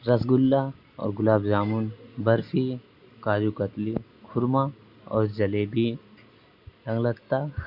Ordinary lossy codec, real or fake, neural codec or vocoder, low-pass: Opus, 64 kbps; real; none; 5.4 kHz